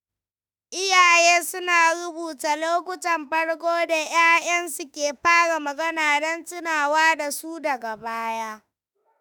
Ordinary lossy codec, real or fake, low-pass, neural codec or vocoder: none; fake; none; autoencoder, 48 kHz, 32 numbers a frame, DAC-VAE, trained on Japanese speech